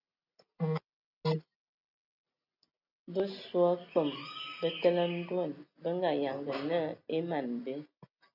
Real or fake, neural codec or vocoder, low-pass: real; none; 5.4 kHz